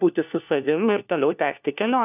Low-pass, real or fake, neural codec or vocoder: 3.6 kHz; fake; codec, 16 kHz, 1 kbps, FunCodec, trained on LibriTTS, 50 frames a second